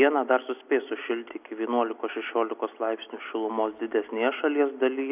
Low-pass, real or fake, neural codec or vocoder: 3.6 kHz; real; none